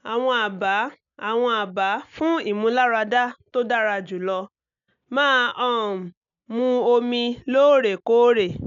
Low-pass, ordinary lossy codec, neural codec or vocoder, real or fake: 7.2 kHz; none; none; real